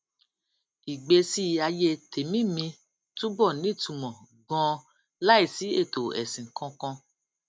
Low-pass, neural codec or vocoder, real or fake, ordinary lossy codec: none; none; real; none